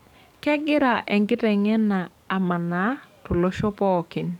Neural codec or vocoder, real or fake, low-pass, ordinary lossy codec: codec, 44.1 kHz, 7.8 kbps, DAC; fake; 19.8 kHz; none